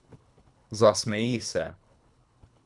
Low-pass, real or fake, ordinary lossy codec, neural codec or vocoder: 10.8 kHz; fake; none; codec, 24 kHz, 3 kbps, HILCodec